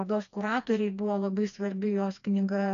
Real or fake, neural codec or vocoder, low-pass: fake; codec, 16 kHz, 2 kbps, FreqCodec, smaller model; 7.2 kHz